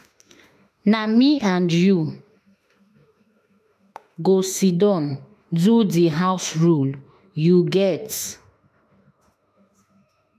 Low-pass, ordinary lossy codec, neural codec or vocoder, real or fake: 14.4 kHz; MP3, 96 kbps; autoencoder, 48 kHz, 32 numbers a frame, DAC-VAE, trained on Japanese speech; fake